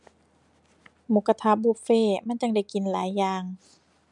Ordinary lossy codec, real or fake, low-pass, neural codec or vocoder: MP3, 96 kbps; real; 10.8 kHz; none